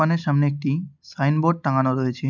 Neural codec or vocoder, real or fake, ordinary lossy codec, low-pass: none; real; none; 7.2 kHz